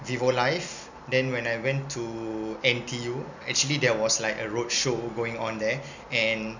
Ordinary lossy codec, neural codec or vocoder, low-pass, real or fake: none; none; 7.2 kHz; real